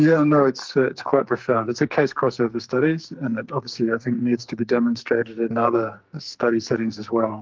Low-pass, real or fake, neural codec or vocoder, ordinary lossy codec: 7.2 kHz; fake; codec, 44.1 kHz, 2.6 kbps, SNAC; Opus, 32 kbps